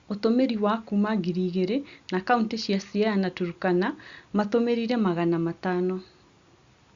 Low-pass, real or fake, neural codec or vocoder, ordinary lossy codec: 7.2 kHz; real; none; Opus, 64 kbps